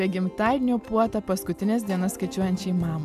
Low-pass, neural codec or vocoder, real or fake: 14.4 kHz; none; real